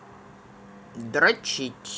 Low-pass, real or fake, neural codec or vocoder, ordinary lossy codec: none; real; none; none